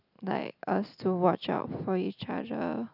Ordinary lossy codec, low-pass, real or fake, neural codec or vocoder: none; 5.4 kHz; real; none